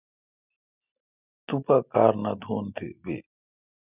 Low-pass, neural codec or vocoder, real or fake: 3.6 kHz; none; real